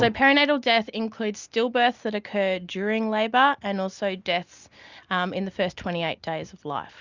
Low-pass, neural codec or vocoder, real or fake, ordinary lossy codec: 7.2 kHz; none; real; Opus, 64 kbps